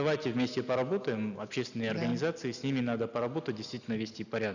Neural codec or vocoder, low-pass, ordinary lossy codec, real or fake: none; 7.2 kHz; none; real